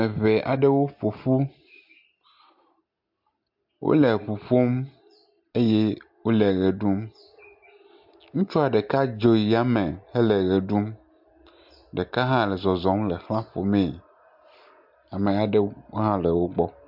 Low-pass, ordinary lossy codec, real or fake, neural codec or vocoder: 5.4 kHz; MP3, 48 kbps; real; none